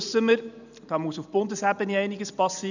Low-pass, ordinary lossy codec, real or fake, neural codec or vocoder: 7.2 kHz; none; real; none